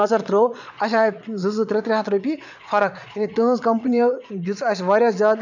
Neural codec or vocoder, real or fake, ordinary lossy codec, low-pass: vocoder, 22.05 kHz, 80 mel bands, Vocos; fake; none; 7.2 kHz